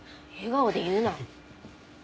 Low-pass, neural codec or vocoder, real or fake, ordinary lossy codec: none; none; real; none